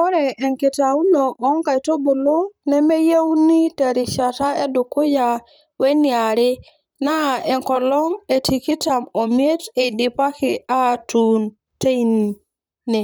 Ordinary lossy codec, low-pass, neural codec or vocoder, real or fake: none; none; vocoder, 44.1 kHz, 128 mel bands, Pupu-Vocoder; fake